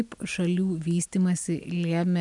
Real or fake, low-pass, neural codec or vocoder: real; 10.8 kHz; none